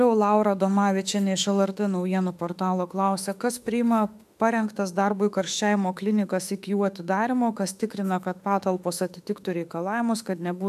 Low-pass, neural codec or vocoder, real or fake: 14.4 kHz; autoencoder, 48 kHz, 32 numbers a frame, DAC-VAE, trained on Japanese speech; fake